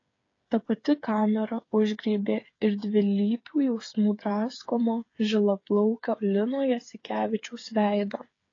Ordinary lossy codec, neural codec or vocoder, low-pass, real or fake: AAC, 32 kbps; codec, 16 kHz, 8 kbps, FreqCodec, smaller model; 7.2 kHz; fake